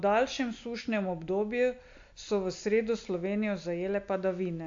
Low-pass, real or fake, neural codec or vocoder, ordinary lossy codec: 7.2 kHz; real; none; AAC, 48 kbps